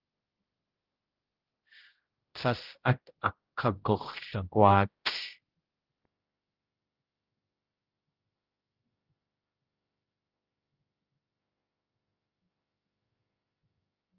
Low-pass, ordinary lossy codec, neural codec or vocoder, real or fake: 5.4 kHz; Opus, 16 kbps; codec, 16 kHz, 0.5 kbps, X-Codec, HuBERT features, trained on general audio; fake